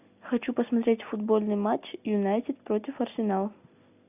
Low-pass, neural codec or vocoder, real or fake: 3.6 kHz; none; real